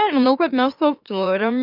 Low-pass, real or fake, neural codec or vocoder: 5.4 kHz; fake; autoencoder, 44.1 kHz, a latent of 192 numbers a frame, MeloTTS